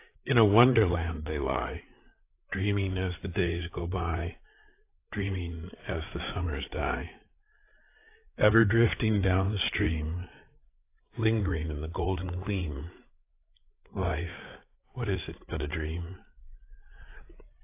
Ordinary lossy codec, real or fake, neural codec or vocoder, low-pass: AAC, 24 kbps; fake; codec, 16 kHz, 8 kbps, FreqCodec, larger model; 3.6 kHz